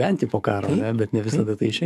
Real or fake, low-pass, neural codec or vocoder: fake; 14.4 kHz; codec, 44.1 kHz, 7.8 kbps, DAC